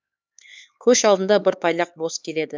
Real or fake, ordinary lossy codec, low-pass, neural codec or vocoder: fake; none; none; codec, 16 kHz, 4 kbps, X-Codec, HuBERT features, trained on LibriSpeech